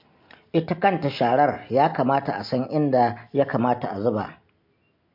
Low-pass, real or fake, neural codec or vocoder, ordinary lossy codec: 5.4 kHz; real; none; MP3, 48 kbps